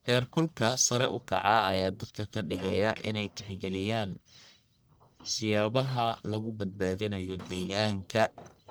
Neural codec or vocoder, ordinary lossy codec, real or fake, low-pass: codec, 44.1 kHz, 1.7 kbps, Pupu-Codec; none; fake; none